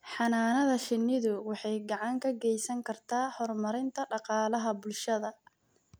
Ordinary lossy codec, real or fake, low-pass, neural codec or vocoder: none; real; none; none